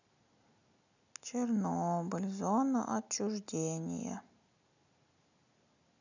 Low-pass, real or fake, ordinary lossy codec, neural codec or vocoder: 7.2 kHz; real; none; none